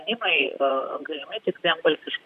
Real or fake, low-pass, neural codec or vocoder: fake; 14.4 kHz; vocoder, 44.1 kHz, 128 mel bands, Pupu-Vocoder